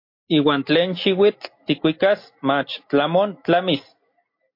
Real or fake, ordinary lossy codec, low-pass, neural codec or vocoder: real; MP3, 32 kbps; 5.4 kHz; none